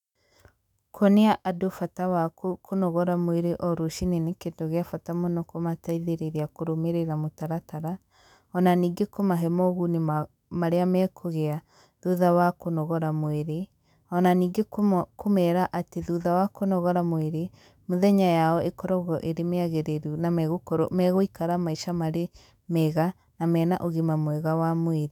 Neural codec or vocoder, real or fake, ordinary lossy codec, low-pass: autoencoder, 48 kHz, 128 numbers a frame, DAC-VAE, trained on Japanese speech; fake; none; 19.8 kHz